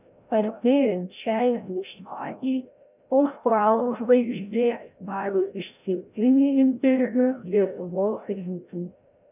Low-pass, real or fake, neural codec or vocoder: 3.6 kHz; fake; codec, 16 kHz, 0.5 kbps, FreqCodec, larger model